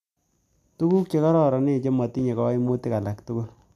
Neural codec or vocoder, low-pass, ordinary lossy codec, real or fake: none; 14.4 kHz; none; real